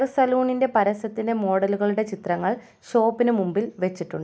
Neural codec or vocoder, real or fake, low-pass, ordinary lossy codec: none; real; none; none